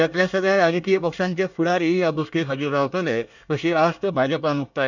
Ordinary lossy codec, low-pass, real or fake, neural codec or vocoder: none; 7.2 kHz; fake; codec, 24 kHz, 1 kbps, SNAC